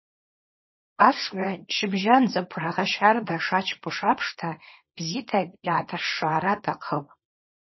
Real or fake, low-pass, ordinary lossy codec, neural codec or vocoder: fake; 7.2 kHz; MP3, 24 kbps; codec, 24 kHz, 0.9 kbps, WavTokenizer, small release